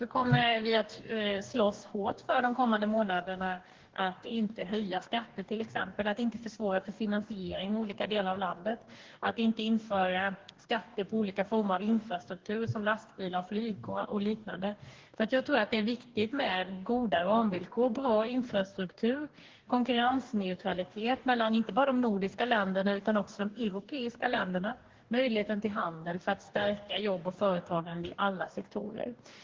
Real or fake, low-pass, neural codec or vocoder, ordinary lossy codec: fake; 7.2 kHz; codec, 44.1 kHz, 2.6 kbps, DAC; Opus, 16 kbps